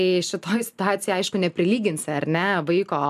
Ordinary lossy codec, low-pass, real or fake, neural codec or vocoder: MP3, 96 kbps; 14.4 kHz; real; none